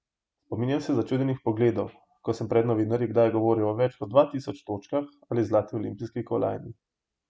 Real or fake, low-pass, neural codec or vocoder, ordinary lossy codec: real; none; none; none